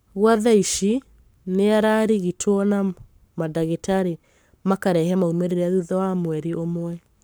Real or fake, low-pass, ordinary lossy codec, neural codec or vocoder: fake; none; none; codec, 44.1 kHz, 7.8 kbps, Pupu-Codec